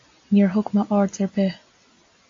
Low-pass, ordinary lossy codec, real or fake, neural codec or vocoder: 7.2 kHz; AAC, 48 kbps; real; none